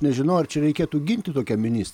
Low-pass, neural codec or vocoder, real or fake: 19.8 kHz; none; real